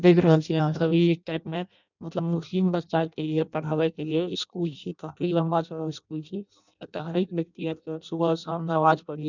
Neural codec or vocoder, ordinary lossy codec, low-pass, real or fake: codec, 16 kHz in and 24 kHz out, 0.6 kbps, FireRedTTS-2 codec; none; 7.2 kHz; fake